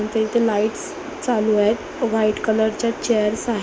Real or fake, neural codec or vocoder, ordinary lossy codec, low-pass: real; none; none; none